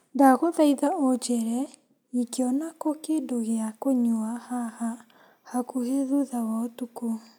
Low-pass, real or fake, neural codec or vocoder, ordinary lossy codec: none; real; none; none